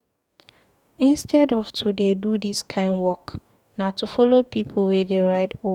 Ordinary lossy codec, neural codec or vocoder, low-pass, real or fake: none; codec, 44.1 kHz, 2.6 kbps, DAC; 19.8 kHz; fake